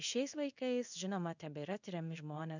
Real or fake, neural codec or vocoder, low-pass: fake; codec, 16 kHz in and 24 kHz out, 1 kbps, XY-Tokenizer; 7.2 kHz